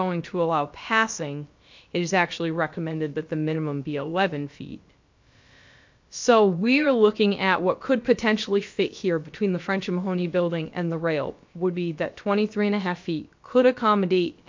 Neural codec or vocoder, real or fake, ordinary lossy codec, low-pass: codec, 16 kHz, about 1 kbps, DyCAST, with the encoder's durations; fake; MP3, 48 kbps; 7.2 kHz